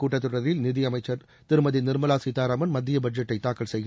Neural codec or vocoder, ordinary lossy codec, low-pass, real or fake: none; none; none; real